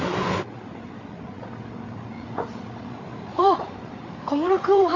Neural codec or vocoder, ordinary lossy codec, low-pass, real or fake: codec, 16 kHz, 8 kbps, FreqCodec, larger model; AAC, 48 kbps; 7.2 kHz; fake